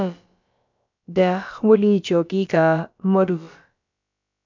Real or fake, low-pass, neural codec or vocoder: fake; 7.2 kHz; codec, 16 kHz, about 1 kbps, DyCAST, with the encoder's durations